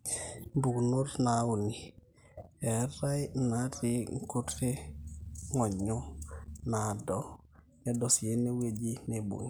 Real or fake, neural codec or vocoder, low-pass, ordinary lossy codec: real; none; none; none